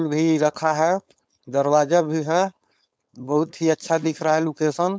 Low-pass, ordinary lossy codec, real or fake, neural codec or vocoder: none; none; fake; codec, 16 kHz, 4.8 kbps, FACodec